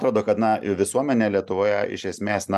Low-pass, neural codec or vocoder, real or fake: 14.4 kHz; none; real